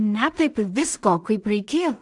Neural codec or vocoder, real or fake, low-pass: codec, 16 kHz in and 24 kHz out, 0.4 kbps, LongCat-Audio-Codec, two codebook decoder; fake; 10.8 kHz